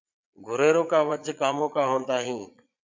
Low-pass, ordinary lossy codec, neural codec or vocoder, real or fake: 7.2 kHz; MP3, 64 kbps; vocoder, 22.05 kHz, 80 mel bands, Vocos; fake